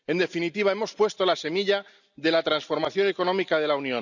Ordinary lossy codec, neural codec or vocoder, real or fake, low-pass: none; none; real; 7.2 kHz